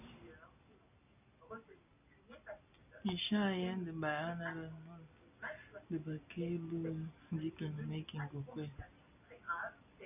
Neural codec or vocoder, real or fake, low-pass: none; real; 3.6 kHz